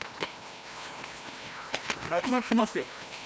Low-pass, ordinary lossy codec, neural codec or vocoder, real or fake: none; none; codec, 16 kHz, 1 kbps, FreqCodec, larger model; fake